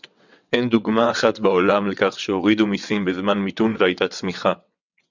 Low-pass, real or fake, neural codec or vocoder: 7.2 kHz; fake; vocoder, 22.05 kHz, 80 mel bands, WaveNeXt